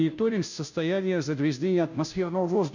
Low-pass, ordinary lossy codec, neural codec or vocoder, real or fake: 7.2 kHz; none; codec, 16 kHz, 0.5 kbps, FunCodec, trained on Chinese and English, 25 frames a second; fake